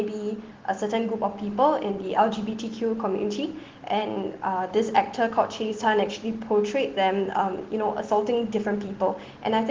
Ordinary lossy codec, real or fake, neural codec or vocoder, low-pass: Opus, 16 kbps; real; none; 7.2 kHz